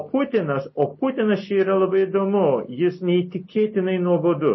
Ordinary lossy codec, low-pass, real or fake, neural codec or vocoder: MP3, 24 kbps; 7.2 kHz; real; none